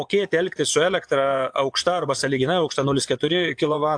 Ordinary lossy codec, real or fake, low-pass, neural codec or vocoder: AAC, 64 kbps; fake; 9.9 kHz; vocoder, 24 kHz, 100 mel bands, Vocos